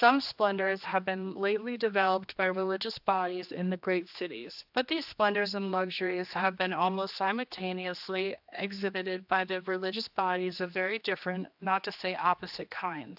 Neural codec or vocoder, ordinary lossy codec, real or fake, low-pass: codec, 16 kHz, 2 kbps, X-Codec, HuBERT features, trained on general audio; AAC, 48 kbps; fake; 5.4 kHz